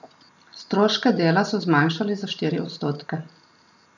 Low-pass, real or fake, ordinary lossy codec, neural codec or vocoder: 7.2 kHz; real; none; none